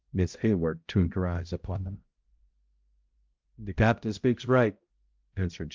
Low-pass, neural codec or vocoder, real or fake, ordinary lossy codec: 7.2 kHz; codec, 16 kHz, 0.5 kbps, X-Codec, HuBERT features, trained on balanced general audio; fake; Opus, 32 kbps